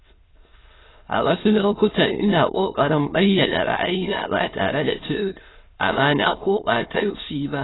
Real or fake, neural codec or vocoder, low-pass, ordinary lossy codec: fake; autoencoder, 22.05 kHz, a latent of 192 numbers a frame, VITS, trained on many speakers; 7.2 kHz; AAC, 16 kbps